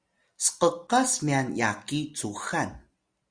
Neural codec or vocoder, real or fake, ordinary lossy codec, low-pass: none; real; Opus, 64 kbps; 9.9 kHz